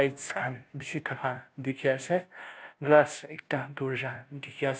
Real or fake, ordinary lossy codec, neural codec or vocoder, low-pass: fake; none; codec, 16 kHz, 0.5 kbps, FunCodec, trained on Chinese and English, 25 frames a second; none